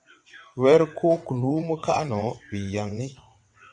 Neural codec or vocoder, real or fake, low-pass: vocoder, 22.05 kHz, 80 mel bands, WaveNeXt; fake; 9.9 kHz